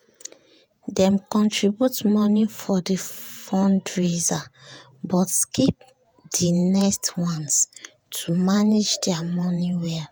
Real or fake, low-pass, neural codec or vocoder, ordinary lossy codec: fake; none; vocoder, 48 kHz, 128 mel bands, Vocos; none